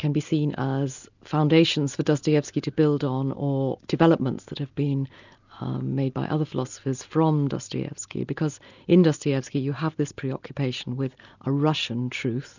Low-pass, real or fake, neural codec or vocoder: 7.2 kHz; real; none